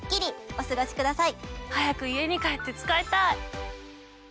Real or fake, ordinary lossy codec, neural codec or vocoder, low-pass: real; none; none; none